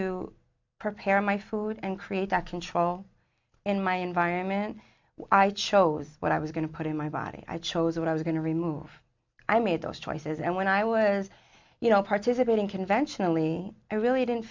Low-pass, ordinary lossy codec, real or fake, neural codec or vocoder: 7.2 kHz; MP3, 64 kbps; real; none